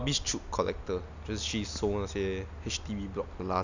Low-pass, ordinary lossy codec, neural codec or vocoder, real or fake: 7.2 kHz; none; none; real